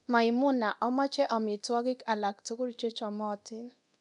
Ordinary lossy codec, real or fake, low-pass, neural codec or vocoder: none; fake; 10.8 kHz; codec, 24 kHz, 0.9 kbps, DualCodec